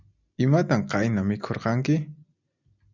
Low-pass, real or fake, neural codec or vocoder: 7.2 kHz; real; none